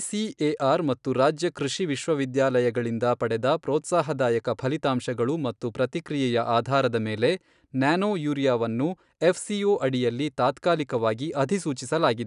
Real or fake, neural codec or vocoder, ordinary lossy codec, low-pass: real; none; none; 10.8 kHz